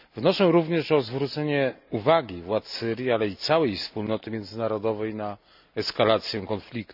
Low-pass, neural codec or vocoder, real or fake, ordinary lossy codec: 5.4 kHz; none; real; none